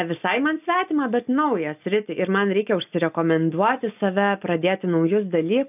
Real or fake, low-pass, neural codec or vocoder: real; 3.6 kHz; none